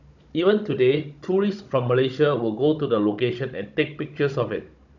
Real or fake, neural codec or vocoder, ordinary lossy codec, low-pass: fake; codec, 16 kHz, 16 kbps, FunCodec, trained on Chinese and English, 50 frames a second; none; 7.2 kHz